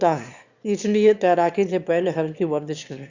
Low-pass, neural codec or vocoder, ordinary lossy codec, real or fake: 7.2 kHz; autoencoder, 22.05 kHz, a latent of 192 numbers a frame, VITS, trained on one speaker; Opus, 64 kbps; fake